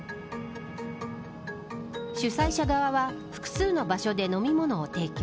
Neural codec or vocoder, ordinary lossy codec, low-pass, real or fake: none; none; none; real